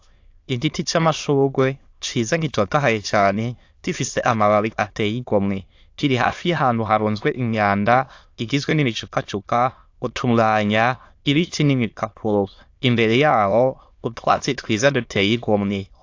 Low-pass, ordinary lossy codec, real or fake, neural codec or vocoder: 7.2 kHz; AAC, 48 kbps; fake; autoencoder, 22.05 kHz, a latent of 192 numbers a frame, VITS, trained on many speakers